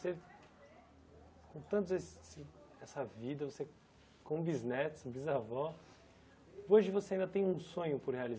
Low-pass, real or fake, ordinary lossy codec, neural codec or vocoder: none; real; none; none